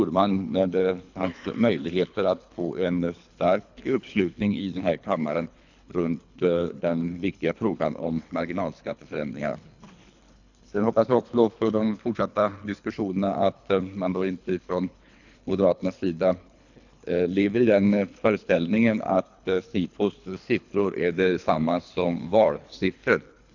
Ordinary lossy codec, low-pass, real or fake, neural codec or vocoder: none; 7.2 kHz; fake; codec, 24 kHz, 3 kbps, HILCodec